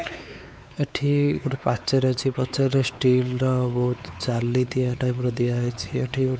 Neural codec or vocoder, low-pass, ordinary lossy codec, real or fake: codec, 16 kHz, 4 kbps, X-Codec, WavLM features, trained on Multilingual LibriSpeech; none; none; fake